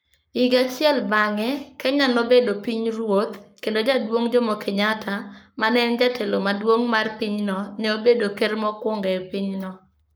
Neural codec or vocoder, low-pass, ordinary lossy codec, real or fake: codec, 44.1 kHz, 7.8 kbps, Pupu-Codec; none; none; fake